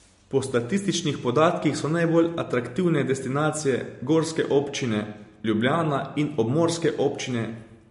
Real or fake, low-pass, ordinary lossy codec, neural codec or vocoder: real; 10.8 kHz; MP3, 48 kbps; none